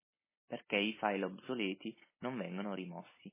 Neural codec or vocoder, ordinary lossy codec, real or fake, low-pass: none; MP3, 16 kbps; real; 3.6 kHz